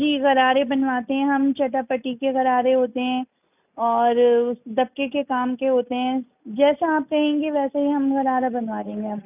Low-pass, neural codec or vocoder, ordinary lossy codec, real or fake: 3.6 kHz; none; none; real